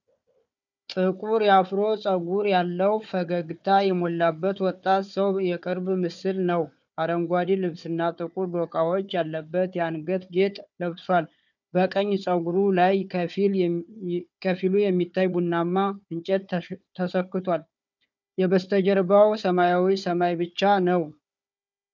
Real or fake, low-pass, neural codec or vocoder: fake; 7.2 kHz; codec, 16 kHz, 4 kbps, FunCodec, trained on Chinese and English, 50 frames a second